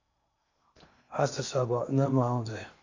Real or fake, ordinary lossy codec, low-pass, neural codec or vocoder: fake; AAC, 48 kbps; 7.2 kHz; codec, 16 kHz in and 24 kHz out, 0.8 kbps, FocalCodec, streaming, 65536 codes